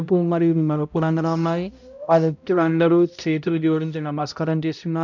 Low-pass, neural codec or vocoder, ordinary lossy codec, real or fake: 7.2 kHz; codec, 16 kHz, 0.5 kbps, X-Codec, HuBERT features, trained on balanced general audio; none; fake